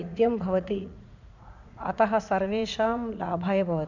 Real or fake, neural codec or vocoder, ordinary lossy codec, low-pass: fake; vocoder, 44.1 kHz, 80 mel bands, Vocos; none; 7.2 kHz